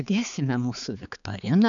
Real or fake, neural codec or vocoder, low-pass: fake; codec, 16 kHz, 4 kbps, X-Codec, HuBERT features, trained on balanced general audio; 7.2 kHz